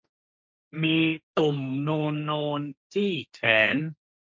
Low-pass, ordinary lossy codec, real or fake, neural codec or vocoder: none; none; fake; codec, 16 kHz, 1.1 kbps, Voila-Tokenizer